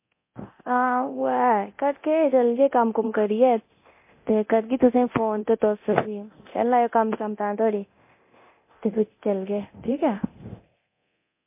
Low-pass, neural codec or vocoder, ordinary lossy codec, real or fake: 3.6 kHz; codec, 24 kHz, 0.9 kbps, DualCodec; MP3, 24 kbps; fake